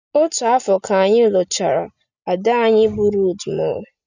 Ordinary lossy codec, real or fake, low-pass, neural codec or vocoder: none; real; 7.2 kHz; none